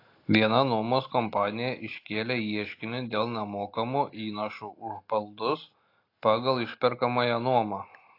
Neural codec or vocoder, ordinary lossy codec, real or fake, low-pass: none; AAC, 32 kbps; real; 5.4 kHz